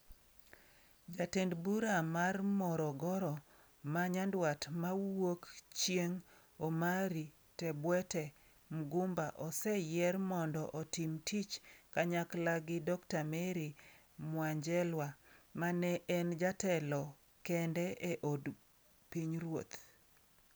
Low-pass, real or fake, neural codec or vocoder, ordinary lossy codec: none; real; none; none